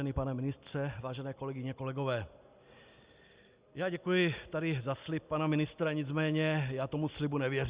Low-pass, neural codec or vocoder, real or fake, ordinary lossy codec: 3.6 kHz; none; real; Opus, 64 kbps